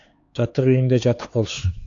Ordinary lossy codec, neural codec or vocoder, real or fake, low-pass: AAC, 48 kbps; codec, 16 kHz, 4 kbps, X-Codec, HuBERT features, trained on LibriSpeech; fake; 7.2 kHz